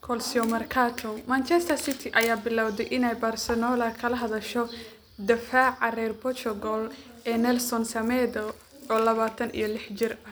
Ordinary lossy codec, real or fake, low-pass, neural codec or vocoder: none; real; none; none